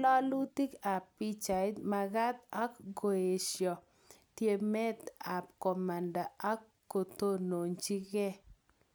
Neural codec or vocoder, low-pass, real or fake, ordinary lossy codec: none; none; real; none